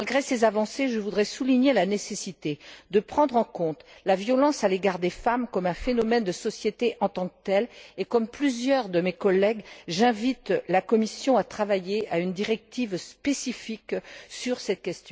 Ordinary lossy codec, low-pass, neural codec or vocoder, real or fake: none; none; none; real